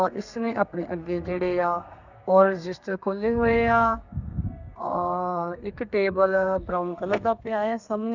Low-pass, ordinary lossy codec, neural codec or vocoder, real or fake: 7.2 kHz; none; codec, 32 kHz, 1.9 kbps, SNAC; fake